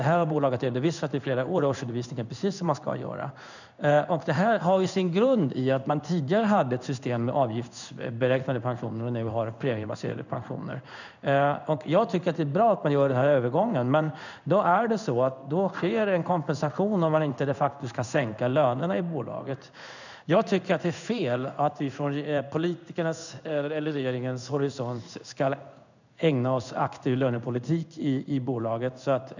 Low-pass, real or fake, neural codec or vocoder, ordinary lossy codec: 7.2 kHz; fake; codec, 16 kHz in and 24 kHz out, 1 kbps, XY-Tokenizer; none